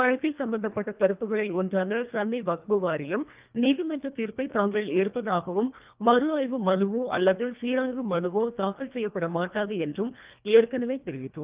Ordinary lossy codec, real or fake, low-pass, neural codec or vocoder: Opus, 24 kbps; fake; 3.6 kHz; codec, 24 kHz, 1.5 kbps, HILCodec